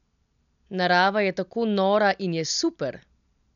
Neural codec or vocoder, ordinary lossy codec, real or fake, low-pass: none; none; real; 7.2 kHz